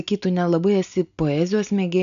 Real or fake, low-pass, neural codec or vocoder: real; 7.2 kHz; none